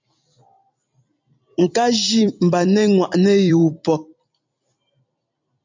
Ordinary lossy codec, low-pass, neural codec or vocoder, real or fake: MP3, 64 kbps; 7.2 kHz; vocoder, 44.1 kHz, 128 mel bands every 256 samples, BigVGAN v2; fake